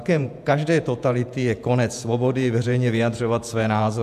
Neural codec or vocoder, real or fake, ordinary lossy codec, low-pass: vocoder, 48 kHz, 128 mel bands, Vocos; fake; AAC, 96 kbps; 14.4 kHz